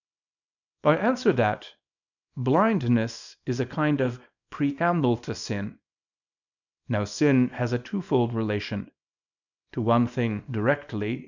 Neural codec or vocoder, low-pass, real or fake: codec, 24 kHz, 0.9 kbps, WavTokenizer, small release; 7.2 kHz; fake